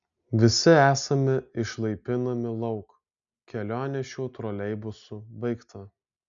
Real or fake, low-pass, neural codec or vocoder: real; 7.2 kHz; none